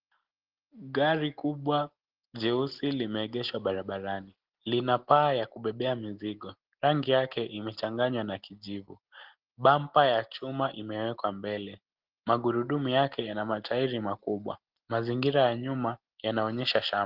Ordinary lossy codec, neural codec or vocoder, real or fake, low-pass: Opus, 16 kbps; none; real; 5.4 kHz